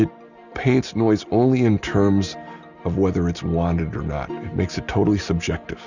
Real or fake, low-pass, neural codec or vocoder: real; 7.2 kHz; none